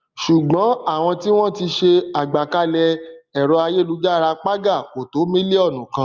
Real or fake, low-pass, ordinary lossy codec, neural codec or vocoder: real; 7.2 kHz; Opus, 32 kbps; none